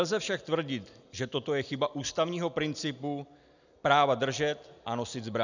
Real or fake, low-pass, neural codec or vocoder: real; 7.2 kHz; none